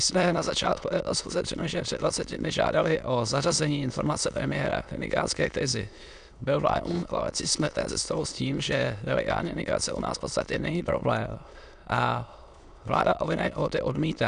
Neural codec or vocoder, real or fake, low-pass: autoencoder, 22.05 kHz, a latent of 192 numbers a frame, VITS, trained on many speakers; fake; 9.9 kHz